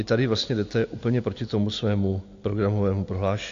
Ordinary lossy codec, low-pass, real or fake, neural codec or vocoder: AAC, 48 kbps; 7.2 kHz; real; none